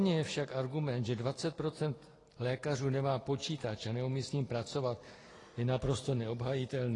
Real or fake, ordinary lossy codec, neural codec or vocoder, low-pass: real; AAC, 32 kbps; none; 10.8 kHz